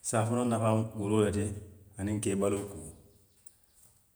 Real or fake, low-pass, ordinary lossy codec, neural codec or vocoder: fake; none; none; vocoder, 48 kHz, 128 mel bands, Vocos